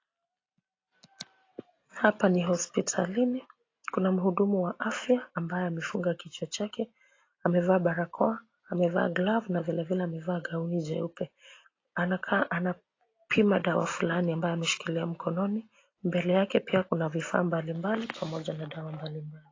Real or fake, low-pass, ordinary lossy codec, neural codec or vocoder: real; 7.2 kHz; AAC, 32 kbps; none